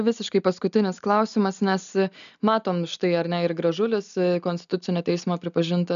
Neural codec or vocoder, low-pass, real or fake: none; 7.2 kHz; real